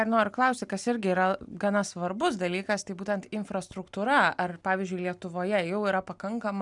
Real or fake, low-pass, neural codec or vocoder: real; 10.8 kHz; none